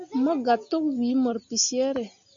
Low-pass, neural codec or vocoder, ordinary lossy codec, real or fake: 7.2 kHz; none; MP3, 64 kbps; real